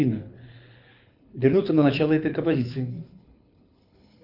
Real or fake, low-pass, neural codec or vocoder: fake; 5.4 kHz; codec, 24 kHz, 6 kbps, HILCodec